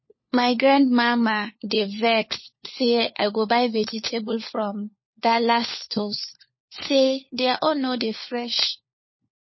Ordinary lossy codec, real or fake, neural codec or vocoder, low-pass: MP3, 24 kbps; fake; codec, 16 kHz, 4 kbps, FunCodec, trained on LibriTTS, 50 frames a second; 7.2 kHz